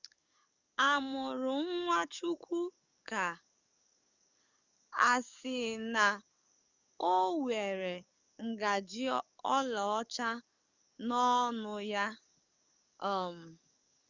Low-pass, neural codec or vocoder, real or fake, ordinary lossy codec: 7.2 kHz; codec, 44.1 kHz, 7.8 kbps, DAC; fake; Opus, 64 kbps